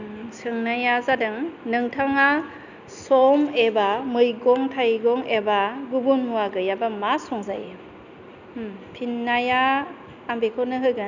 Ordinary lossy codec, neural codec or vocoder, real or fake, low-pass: none; none; real; 7.2 kHz